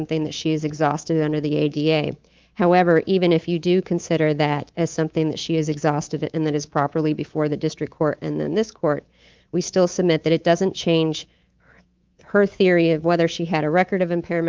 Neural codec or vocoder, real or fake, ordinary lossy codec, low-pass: codec, 24 kHz, 3.1 kbps, DualCodec; fake; Opus, 24 kbps; 7.2 kHz